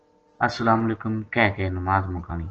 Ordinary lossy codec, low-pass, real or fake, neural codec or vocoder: Opus, 16 kbps; 7.2 kHz; real; none